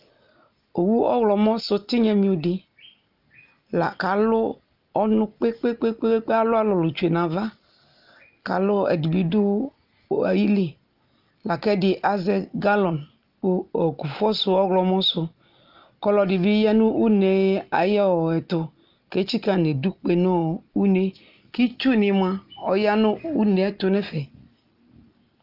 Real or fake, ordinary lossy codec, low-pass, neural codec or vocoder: real; Opus, 32 kbps; 5.4 kHz; none